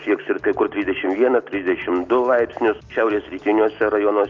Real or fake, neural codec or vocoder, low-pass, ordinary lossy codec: real; none; 7.2 kHz; Opus, 32 kbps